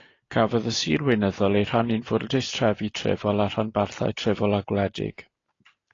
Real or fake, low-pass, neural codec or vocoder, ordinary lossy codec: real; 7.2 kHz; none; AAC, 32 kbps